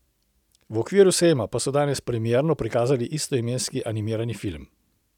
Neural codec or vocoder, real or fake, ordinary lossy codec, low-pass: none; real; none; 19.8 kHz